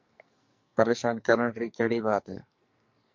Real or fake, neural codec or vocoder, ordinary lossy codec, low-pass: fake; codec, 44.1 kHz, 2.6 kbps, SNAC; MP3, 48 kbps; 7.2 kHz